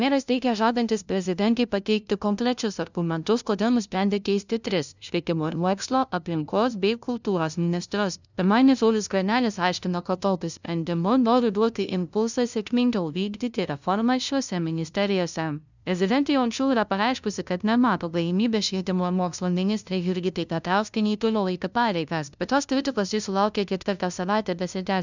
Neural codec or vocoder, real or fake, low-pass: codec, 16 kHz, 0.5 kbps, FunCodec, trained on LibriTTS, 25 frames a second; fake; 7.2 kHz